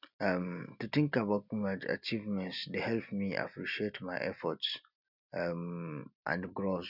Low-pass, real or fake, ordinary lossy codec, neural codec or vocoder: 5.4 kHz; real; none; none